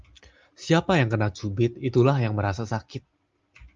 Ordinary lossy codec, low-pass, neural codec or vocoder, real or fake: Opus, 32 kbps; 7.2 kHz; none; real